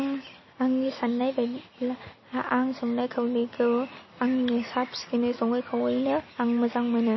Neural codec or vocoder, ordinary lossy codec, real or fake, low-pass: none; MP3, 24 kbps; real; 7.2 kHz